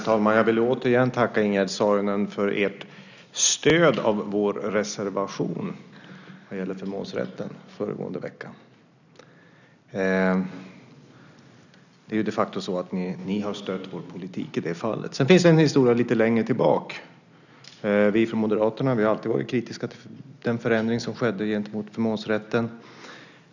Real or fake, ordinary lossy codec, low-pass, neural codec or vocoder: real; none; 7.2 kHz; none